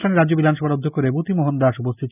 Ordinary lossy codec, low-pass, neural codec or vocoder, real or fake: none; 3.6 kHz; none; real